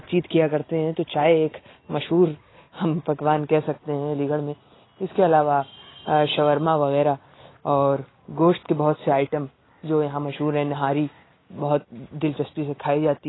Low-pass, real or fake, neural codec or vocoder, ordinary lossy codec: 7.2 kHz; real; none; AAC, 16 kbps